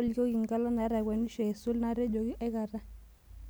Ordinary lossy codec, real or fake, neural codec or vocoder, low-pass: none; real; none; none